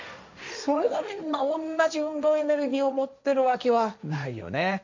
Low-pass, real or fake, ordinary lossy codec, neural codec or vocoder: 7.2 kHz; fake; MP3, 64 kbps; codec, 16 kHz, 1.1 kbps, Voila-Tokenizer